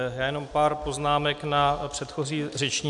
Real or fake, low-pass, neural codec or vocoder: real; 10.8 kHz; none